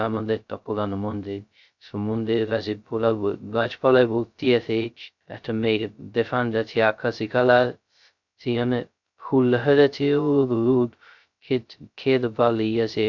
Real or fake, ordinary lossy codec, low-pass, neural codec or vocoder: fake; none; 7.2 kHz; codec, 16 kHz, 0.2 kbps, FocalCodec